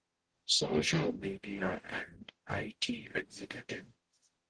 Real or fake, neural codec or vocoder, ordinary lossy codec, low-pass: fake; codec, 44.1 kHz, 0.9 kbps, DAC; Opus, 16 kbps; 9.9 kHz